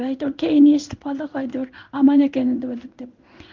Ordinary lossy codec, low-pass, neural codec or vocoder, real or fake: Opus, 24 kbps; 7.2 kHz; codec, 16 kHz in and 24 kHz out, 1 kbps, XY-Tokenizer; fake